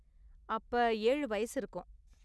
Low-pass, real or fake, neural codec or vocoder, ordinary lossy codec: none; real; none; none